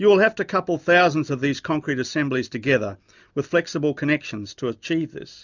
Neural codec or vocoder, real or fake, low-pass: none; real; 7.2 kHz